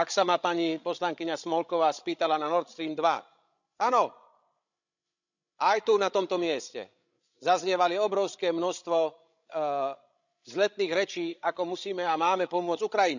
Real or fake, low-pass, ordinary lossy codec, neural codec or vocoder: fake; 7.2 kHz; none; codec, 16 kHz, 16 kbps, FreqCodec, larger model